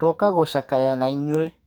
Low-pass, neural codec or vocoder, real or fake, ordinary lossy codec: none; codec, 44.1 kHz, 2.6 kbps, SNAC; fake; none